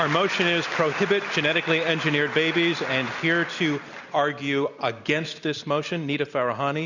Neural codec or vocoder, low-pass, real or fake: none; 7.2 kHz; real